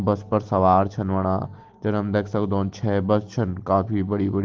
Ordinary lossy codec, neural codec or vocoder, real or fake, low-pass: Opus, 16 kbps; none; real; 7.2 kHz